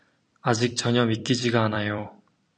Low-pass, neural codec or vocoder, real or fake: 9.9 kHz; vocoder, 44.1 kHz, 128 mel bands every 256 samples, BigVGAN v2; fake